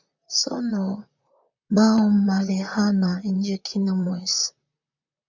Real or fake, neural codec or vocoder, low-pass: fake; vocoder, 44.1 kHz, 128 mel bands, Pupu-Vocoder; 7.2 kHz